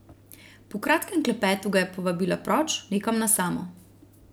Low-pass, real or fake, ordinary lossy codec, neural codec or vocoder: none; real; none; none